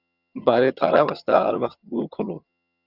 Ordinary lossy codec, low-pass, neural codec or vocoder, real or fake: Opus, 64 kbps; 5.4 kHz; vocoder, 22.05 kHz, 80 mel bands, HiFi-GAN; fake